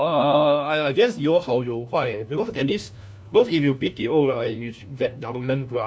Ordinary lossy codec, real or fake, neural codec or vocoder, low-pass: none; fake; codec, 16 kHz, 1 kbps, FunCodec, trained on LibriTTS, 50 frames a second; none